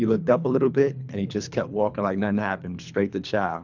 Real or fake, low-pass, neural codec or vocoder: fake; 7.2 kHz; codec, 24 kHz, 3 kbps, HILCodec